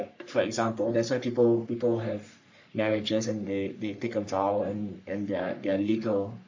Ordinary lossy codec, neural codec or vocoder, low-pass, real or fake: MP3, 48 kbps; codec, 44.1 kHz, 3.4 kbps, Pupu-Codec; 7.2 kHz; fake